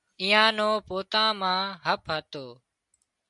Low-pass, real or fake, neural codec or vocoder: 10.8 kHz; real; none